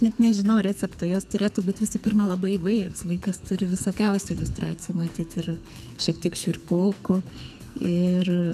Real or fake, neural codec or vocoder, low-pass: fake; codec, 44.1 kHz, 2.6 kbps, SNAC; 14.4 kHz